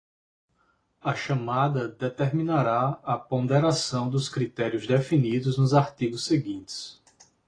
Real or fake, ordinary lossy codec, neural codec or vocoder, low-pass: real; AAC, 32 kbps; none; 9.9 kHz